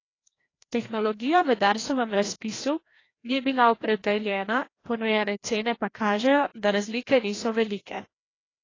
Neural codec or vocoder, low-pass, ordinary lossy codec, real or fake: codec, 16 kHz, 1 kbps, FreqCodec, larger model; 7.2 kHz; AAC, 32 kbps; fake